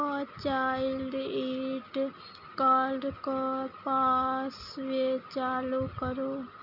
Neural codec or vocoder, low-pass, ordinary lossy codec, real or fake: none; 5.4 kHz; none; real